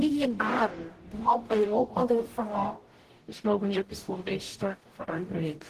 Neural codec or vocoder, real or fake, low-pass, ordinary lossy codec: codec, 44.1 kHz, 0.9 kbps, DAC; fake; 14.4 kHz; Opus, 16 kbps